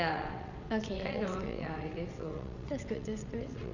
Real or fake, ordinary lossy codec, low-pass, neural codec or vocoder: fake; none; 7.2 kHz; vocoder, 22.05 kHz, 80 mel bands, WaveNeXt